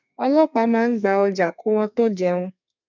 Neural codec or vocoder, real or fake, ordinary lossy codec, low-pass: codec, 32 kHz, 1.9 kbps, SNAC; fake; none; 7.2 kHz